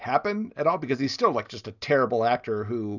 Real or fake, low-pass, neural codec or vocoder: real; 7.2 kHz; none